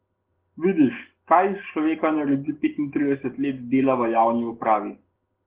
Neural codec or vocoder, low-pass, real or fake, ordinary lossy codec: none; 3.6 kHz; real; Opus, 16 kbps